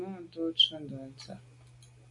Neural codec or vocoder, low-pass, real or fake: none; 10.8 kHz; real